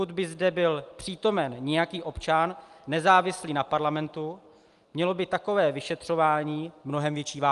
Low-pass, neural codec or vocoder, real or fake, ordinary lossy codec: 10.8 kHz; none; real; Opus, 32 kbps